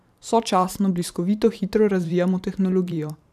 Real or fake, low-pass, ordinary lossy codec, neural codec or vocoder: fake; 14.4 kHz; none; vocoder, 44.1 kHz, 128 mel bands every 512 samples, BigVGAN v2